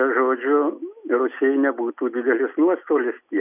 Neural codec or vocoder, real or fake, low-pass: none; real; 3.6 kHz